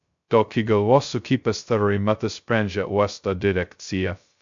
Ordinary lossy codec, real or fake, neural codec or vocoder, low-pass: MP3, 64 kbps; fake; codec, 16 kHz, 0.2 kbps, FocalCodec; 7.2 kHz